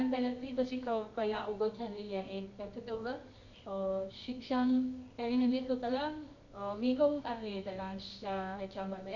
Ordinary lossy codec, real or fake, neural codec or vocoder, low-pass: none; fake; codec, 24 kHz, 0.9 kbps, WavTokenizer, medium music audio release; 7.2 kHz